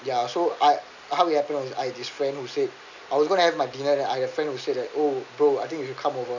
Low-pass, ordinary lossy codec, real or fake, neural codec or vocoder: 7.2 kHz; none; real; none